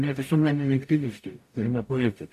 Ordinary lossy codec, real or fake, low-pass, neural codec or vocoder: MP3, 64 kbps; fake; 14.4 kHz; codec, 44.1 kHz, 0.9 kbps, DAC